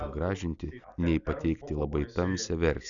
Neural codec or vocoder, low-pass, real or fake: none; 7.2 kHz; real